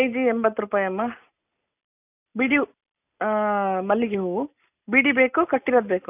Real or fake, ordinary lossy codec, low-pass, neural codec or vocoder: real; none; 3.6 kHz; none